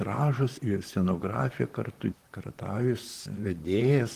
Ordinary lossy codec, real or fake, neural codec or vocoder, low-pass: Opus, 24 kbps; fake; vocoder, 44.1 kHz, 128 mel bands, Pupu-Vocoder; 14.4 kHz